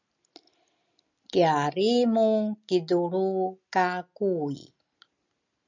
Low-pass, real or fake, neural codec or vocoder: 7.2 kHz; real; none